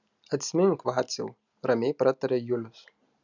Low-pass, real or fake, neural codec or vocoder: 7.2 kHz; real; none